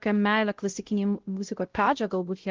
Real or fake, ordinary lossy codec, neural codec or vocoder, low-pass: fake; Opus, 16 kbps; codec, 16 kHz, 0.5 kbps, X-Codec, WavLM features, trained on Multilingual LibriSpeech; 7.2 kHz